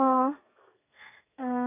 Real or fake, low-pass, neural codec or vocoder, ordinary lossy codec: fake; 3.6 kHz; codec, 32 kHz, 1.9 kbps, SNAC; none